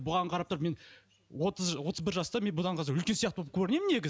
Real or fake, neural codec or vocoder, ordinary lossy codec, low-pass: real; none; none; none